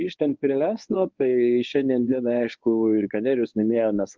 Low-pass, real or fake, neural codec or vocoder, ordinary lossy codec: 7.2 kHz; fake; codec, 24 kHz, 0.9 kbps, WavTokenizer, medium speech release version 1; Opus, 32 kbps